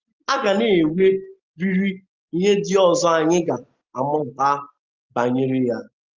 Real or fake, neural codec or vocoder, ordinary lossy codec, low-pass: real; none; Opus, 32 kbps; 7.2 kHz